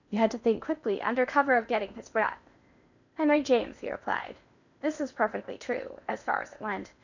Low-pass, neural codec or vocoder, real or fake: 7.2 kHz; codec, 16 kHz in and 24 kHz out, 0.8 kbps, FocalCodec, streaming, 65536 codes; fake